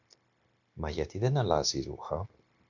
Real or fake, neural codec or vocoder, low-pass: fake; codec, 16 kHz, 0.9 kbps, LongCat-Audio-Codec; 7.2 kHz